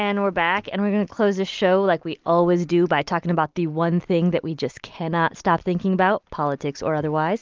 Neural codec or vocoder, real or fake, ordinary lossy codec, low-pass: none; real; Opus, 32 kbps; 7.2 kHz